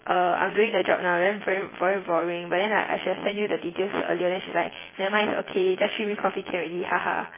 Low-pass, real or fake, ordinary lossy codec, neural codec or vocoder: 3.6 kHz; fake; MP3, 16 kbps; vocoder, 22.05 kHz, 80 mel bands, Vocos